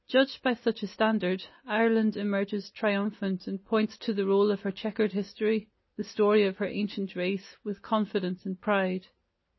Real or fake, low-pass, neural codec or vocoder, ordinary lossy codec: real; 7.2 kHz; none; MP3, 24 kbps